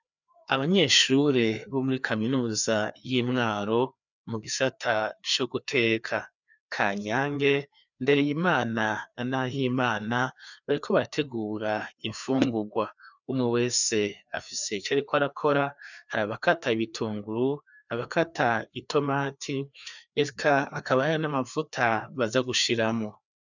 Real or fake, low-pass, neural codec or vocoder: fake; 7.2 kHz; codec, 16 kHz, 2 kbps, FreqCodec, larger model